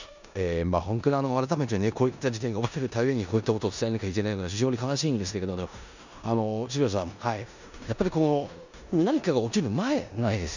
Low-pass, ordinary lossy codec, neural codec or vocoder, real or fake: 7.2 kHz; none; codec, 16 kHz in and 24 kHz out, 0.9 kbps, LongCat-Audio-Codec, four codebook decoder; fake